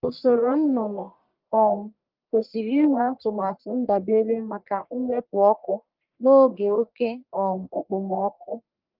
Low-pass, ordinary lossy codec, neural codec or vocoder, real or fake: 5.4 kHz; Opus, 24 kbps; codec, 44.1 kHz, 1.7 kbps, Pupu-Codec; fake